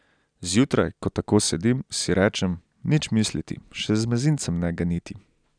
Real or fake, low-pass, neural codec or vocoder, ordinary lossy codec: real; 9.9 kHz; none; none